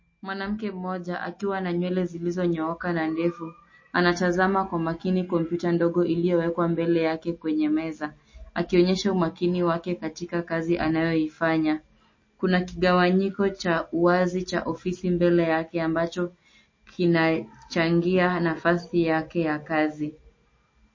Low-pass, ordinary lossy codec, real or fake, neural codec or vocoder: 7.2 kHz; MP3, 32 kbps; real; none